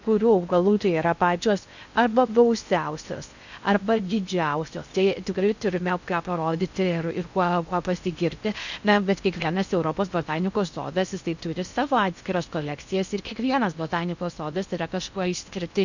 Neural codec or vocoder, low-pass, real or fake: codec, 16 kHz in and 24 kHz out, 0.6 kbps, FocalCodec, streaming, 2048 codes; 7.2 kHz; fake